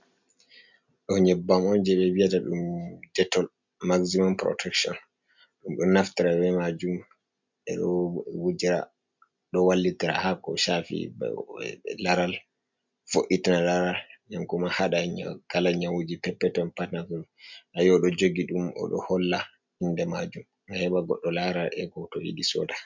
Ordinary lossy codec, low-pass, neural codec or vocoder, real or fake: MP3, 64 kbps; 7.2 kHz; none; real